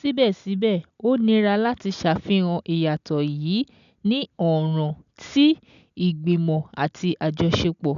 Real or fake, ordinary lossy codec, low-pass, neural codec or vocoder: real; none; 7.2 kHz; none